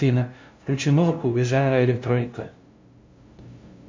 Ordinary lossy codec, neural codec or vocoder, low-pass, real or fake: MP3, 48 kbps; codec, 16 kHz, 0.5 kbps, FunCodec, trained on LibriTTS, 25 frames a second; 7.2 kHz; fake